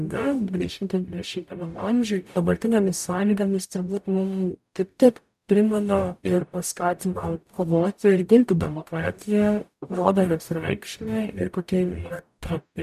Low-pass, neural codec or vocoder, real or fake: 14.4 kHz; codec, 44.1 kHz, 0.9 kbps, DAC; fake